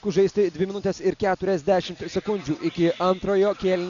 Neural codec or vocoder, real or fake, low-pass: none; real; 7.2 kHz